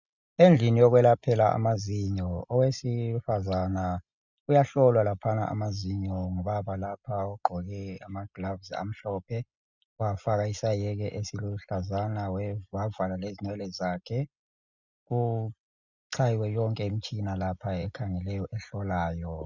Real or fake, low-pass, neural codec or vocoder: real; 7.2 kHz; none